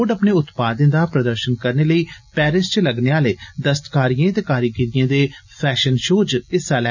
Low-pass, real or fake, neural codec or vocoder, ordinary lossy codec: 7.2 kHz; real; none; none